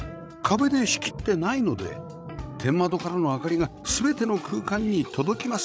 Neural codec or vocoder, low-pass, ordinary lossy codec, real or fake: codec, 16 kHz, 16 kbps, FreqCodec, larger model; none; none; fake